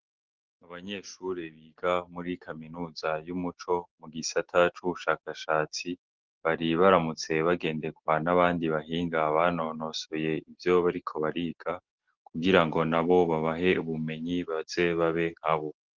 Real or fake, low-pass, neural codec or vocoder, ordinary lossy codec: real; 7.2 kHz; none; Opus, 16 kbps